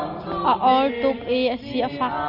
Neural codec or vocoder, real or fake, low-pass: none; real; 5.4 kHz